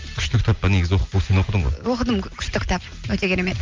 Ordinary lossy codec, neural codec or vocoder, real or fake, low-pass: Opus, 24 kbps; none; real; 7.2 kHz